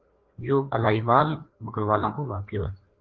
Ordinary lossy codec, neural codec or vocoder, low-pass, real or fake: Opus, 32 kbps; codec, 16 kHz, 2 kbps, FreqCodec, larger model; 7.2 kHz; fake